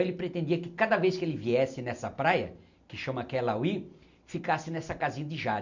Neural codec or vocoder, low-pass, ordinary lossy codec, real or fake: none; 7.2 kHz; none; real